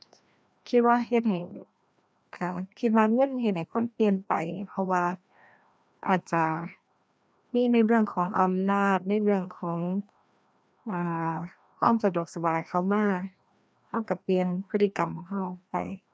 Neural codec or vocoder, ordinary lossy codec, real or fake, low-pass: codec, 16 kHz, 1 kbps, FreqCodec, larger model; none; fake; none